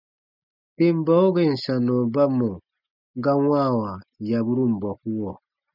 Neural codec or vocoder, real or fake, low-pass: none; real; 5.4 kHz